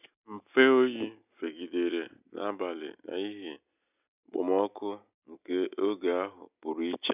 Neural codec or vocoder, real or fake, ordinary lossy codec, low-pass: none; real; none; 3.6 kHz